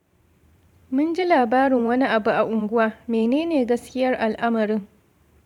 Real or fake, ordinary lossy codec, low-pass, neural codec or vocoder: fake; none; 19.8 kHz; vocoder, 44.1 kHz, 128 mel bands every 512 samples, BigVGAN v2